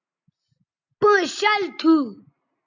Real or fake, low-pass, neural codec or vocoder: real; 7.2 kHz; none